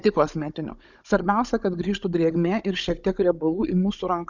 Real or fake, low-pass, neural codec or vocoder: fake; 7.2 kHz; codec, 16 kHz, 16 kbps, FunCodec, trained on Chinese and English, 50 frames a second